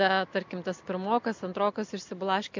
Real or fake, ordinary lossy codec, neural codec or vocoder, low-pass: real; MP3, 64 kbps; none; 7.2 kHz